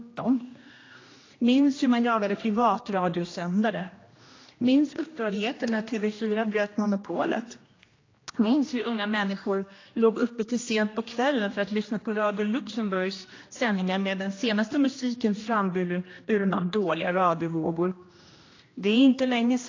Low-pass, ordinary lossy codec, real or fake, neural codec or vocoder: 7.2 kHz; AAC, 32 kbps; fake; codec, 16 kHz, 1 kbps, X-Codec, HuBERT features, trained on general audio